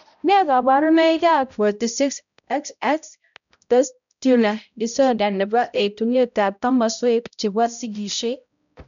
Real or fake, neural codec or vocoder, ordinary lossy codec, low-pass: fake; codec, 16 kHz, 0.5 kbps, X-Codec, HuBERT features, trained on balanced general audio; none; 7.2 kHz